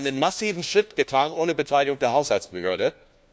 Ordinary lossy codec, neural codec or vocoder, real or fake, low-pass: none; codec, 16 kHz, 1 kbps, FunCodec, trained on LibriTTS, 50 frames a second; fake; none